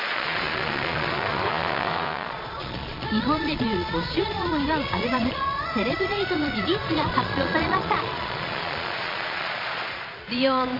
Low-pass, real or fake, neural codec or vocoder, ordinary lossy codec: 5.4 kHz; fake; vocoder, 22.05 kHz, 80 mel bands, Vocos; MP3, 32 kbps